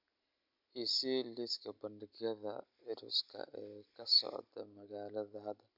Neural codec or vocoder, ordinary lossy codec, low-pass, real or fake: none; MP3, 48 kbps; 5.4 kHz; real